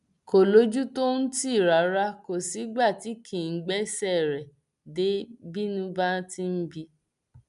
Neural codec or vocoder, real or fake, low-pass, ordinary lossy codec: none; real; 10.8 kHz; none